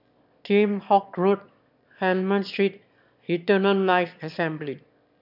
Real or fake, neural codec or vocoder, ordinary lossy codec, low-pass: fake; autoencoder, 22.05 kHz, a latent of 192 numbers a frame, VITS, trained on one speaker; none; 5.4 kHz